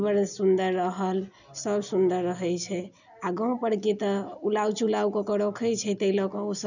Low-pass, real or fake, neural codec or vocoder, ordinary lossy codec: 7.2 kHz; real; none; none